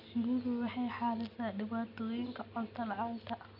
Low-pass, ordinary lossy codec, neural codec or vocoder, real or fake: 5.4 kHz; none; none; real